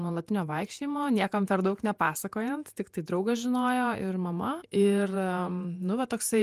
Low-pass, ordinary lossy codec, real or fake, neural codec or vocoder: 14.4 kHz; Opus, 32 kbps; fake; vocoder, 48 kHz, 128 mel bands, Vocos